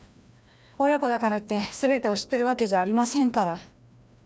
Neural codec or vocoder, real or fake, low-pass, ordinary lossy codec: codec, 16 kHz, 1 kbps, FreqCodec, larger model; fake; none; none